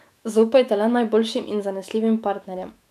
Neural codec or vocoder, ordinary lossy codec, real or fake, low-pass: autoencoder, 48 kHz, 128 numbers a frame, DAC-VAE, trained on Japanese speech; none; fake; 14.4 kHz